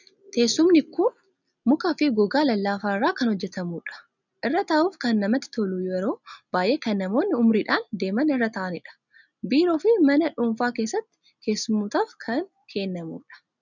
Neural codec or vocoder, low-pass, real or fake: none; 7.2 kHz; real